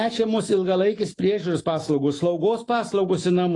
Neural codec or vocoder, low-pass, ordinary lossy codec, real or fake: autoencoder, 48 kHz, 128 numbers a frame, DAC-VAE, trained on Japanese speech; 10.8 kHz; AAC, 32 kbps; fake